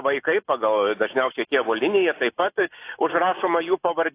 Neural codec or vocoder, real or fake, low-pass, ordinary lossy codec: none; real; 3.6 kHz; AAC, 24 kbps